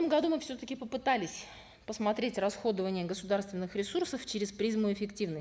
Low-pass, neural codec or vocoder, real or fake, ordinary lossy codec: none; none; real; none